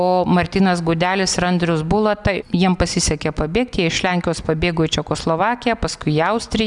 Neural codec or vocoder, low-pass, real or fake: none; 10.8 kHz; real